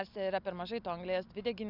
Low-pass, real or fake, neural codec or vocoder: 5.4 kHz; real; none